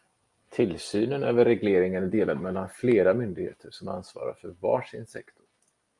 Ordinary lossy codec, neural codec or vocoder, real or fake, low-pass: Opus, 32 kbps; none; real; 10.8 kHz